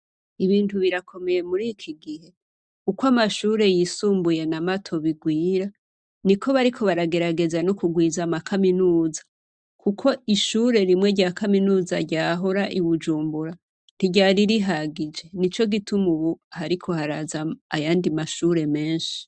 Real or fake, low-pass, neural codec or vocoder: real; 9.9 kHz; none